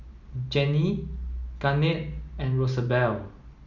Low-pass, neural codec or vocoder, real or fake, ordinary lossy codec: 7.2 kHz; none; real; none